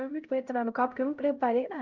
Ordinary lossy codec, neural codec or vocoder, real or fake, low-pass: Opus, 32 kbps; codec, 16 kHz, 0.5 kbps, X-Codec, HuBERT features, trained on LibriSpeech; fake; 7.2 kHz